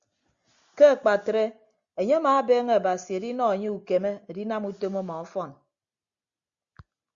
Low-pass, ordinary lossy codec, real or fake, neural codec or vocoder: 7.2 kHz; Opus, 64 kbps; real; none